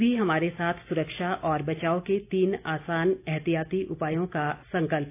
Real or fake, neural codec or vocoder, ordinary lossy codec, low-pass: real; none; AAC, 24 kbps; 3.6 kHz